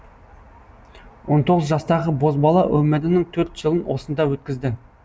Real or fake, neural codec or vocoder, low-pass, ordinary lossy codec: real; none; none; none